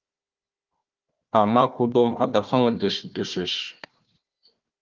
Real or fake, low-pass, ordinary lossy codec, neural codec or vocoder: fake; 7.2 kHz; Opus, 32 kbps; codec, 16 kHz, 1 kbps, FunCodec, trained on Chinese and English, 50 frames a second